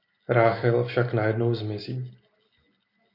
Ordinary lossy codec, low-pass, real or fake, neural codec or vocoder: MP3, 32 kbps; 5.4 kHz; real; none